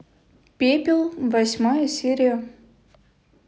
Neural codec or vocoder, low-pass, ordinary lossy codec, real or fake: none; none; none; real